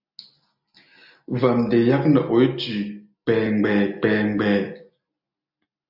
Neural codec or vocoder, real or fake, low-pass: none; real; 5.4 kHz